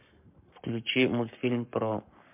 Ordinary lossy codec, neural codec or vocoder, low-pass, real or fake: MP3, 32 kbps; codec, 44.1 kHz, 7.8 kbps, Pupu-Codec; 3.6 kHz; fake